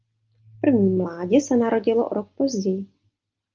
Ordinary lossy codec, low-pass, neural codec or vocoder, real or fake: Opus, 24 kbps; 7.2 kHz; none; real